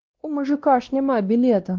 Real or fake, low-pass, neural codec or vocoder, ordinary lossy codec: fake; 7.2 kHz; codec, 16 kHz, 1 kbps, X-Codec, WavLM features, trained on Multilingual LibriSpeech; Opus, 16 kbps